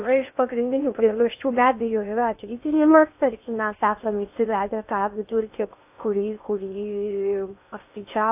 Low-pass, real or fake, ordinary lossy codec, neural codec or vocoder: 3.6 kHz; fake; AAC, 32 kbps; codec, 16 kHz in and 24 kHz out, 0.6 kbps, FocalCodec, streaming, 4096 codes